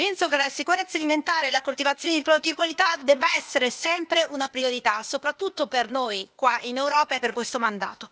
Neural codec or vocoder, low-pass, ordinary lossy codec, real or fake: codec, 16 kHz, 0.8 kbps, ZipCodec; none; none; fake